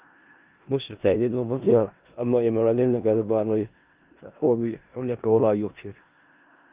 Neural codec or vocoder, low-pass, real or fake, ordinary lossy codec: codec, 16 kHz in and 24 kHz out, 0.4 kbps, LongCat-Audio-Codec, four codebook decoder; 3.6 kHz; fake; Opus, 24 kbps